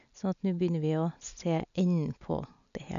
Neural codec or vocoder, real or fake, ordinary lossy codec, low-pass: none; real; none; 7.2 kHz